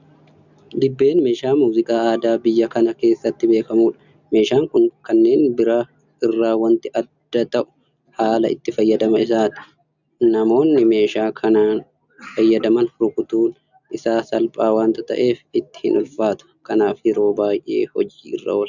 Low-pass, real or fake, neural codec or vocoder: 7.2 kHz; real; none